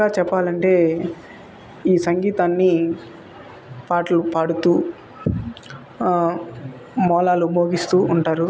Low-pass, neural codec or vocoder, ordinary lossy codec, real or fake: none; none; none; real